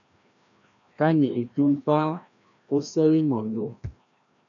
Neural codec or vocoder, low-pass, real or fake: codec, 16 kHz, 1 kbps, FreqCodec, larger model; 7.2 kHz; fake